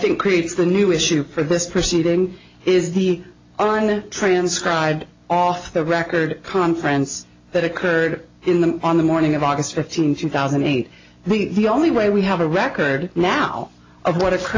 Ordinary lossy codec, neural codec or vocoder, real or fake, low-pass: AAC, 32 kbps; none; real; 7.2 kHz